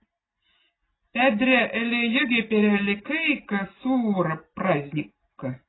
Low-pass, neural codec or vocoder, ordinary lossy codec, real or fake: 7.2 kHz; none; AAC, 16 kbps; real